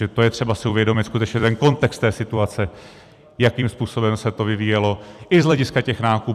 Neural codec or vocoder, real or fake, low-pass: vocoder, 44.1 kHz, 128 mel bands every 256 samples, BigVGAN v2; fake; 14.4 kHz